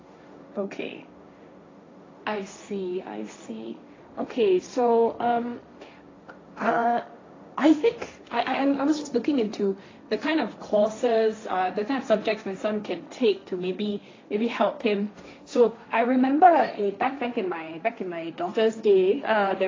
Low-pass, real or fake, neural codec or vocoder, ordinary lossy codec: 7.2 kHz; fake; codec, 16 kHz, 1.1 kbps, Voila-Tokenizer; AAC, 32 kbps